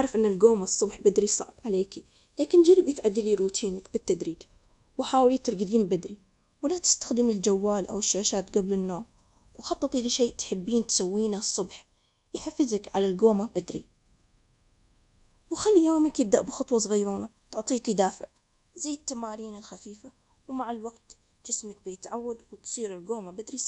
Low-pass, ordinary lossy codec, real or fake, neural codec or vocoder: 10.8 kHz; none; fake; codec, 24 kHz, 1.2 kbps, DualCodec